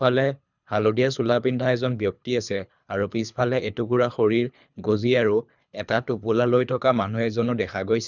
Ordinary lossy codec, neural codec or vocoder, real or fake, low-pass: none; codec, 24 kHz, 3 kbps, HILCodec; fake; 7.2 kHz